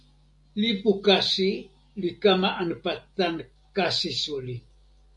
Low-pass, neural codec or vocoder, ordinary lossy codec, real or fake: 10.8 kHz; none; MP3, 96 kbps; real